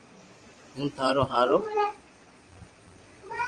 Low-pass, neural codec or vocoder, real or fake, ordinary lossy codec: 9.9 kHz; vocoder, 22.05 kHz, 80 mel bands, Vocos; fake; Opus, 32 kbps